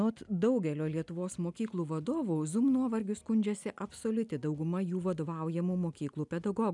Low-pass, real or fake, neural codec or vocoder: 10.8 kHz; real; none